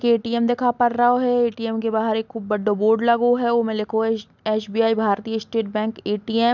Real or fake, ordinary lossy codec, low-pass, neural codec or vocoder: real; none; 7.2 kHz; none